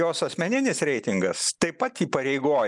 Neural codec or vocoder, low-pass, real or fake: none; 10.8 kHz; real